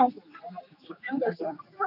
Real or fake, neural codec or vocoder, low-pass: fake; codec, 16 kHz, 2 kbps, X-Codec, HuBERT features, trained on general audio; 5.4 kHz